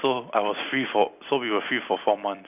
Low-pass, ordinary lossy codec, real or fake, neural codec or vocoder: 3.6 kHz; none; real; none